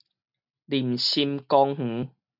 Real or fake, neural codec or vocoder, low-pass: real; none; 5.4 kHz